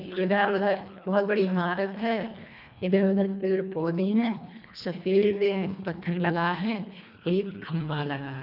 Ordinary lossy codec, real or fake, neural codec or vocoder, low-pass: none; fake; codec, 24 kHz, 1.5 kbps, HILCodec; 5.4 kHz